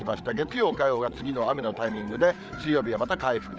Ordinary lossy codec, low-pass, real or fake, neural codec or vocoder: none; none; fake; codec, 16 kHz, 8 kbps, FreqCodec, larger model